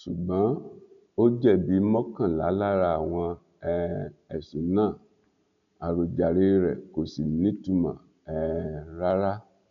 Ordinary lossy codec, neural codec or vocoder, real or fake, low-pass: none; none; real; 7.2 kHz